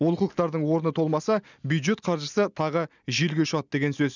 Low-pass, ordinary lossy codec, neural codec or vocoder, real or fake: 7.2 kHz; none; none; real